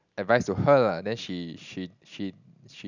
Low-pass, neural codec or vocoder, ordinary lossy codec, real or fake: 7.2 kHz; none; none; real